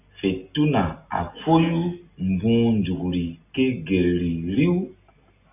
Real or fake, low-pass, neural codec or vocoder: real; 3.6 kHz; none